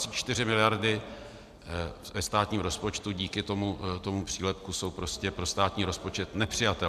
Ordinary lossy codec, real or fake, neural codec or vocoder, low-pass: MP3, 96 kbps; real; none; 14.4 kHz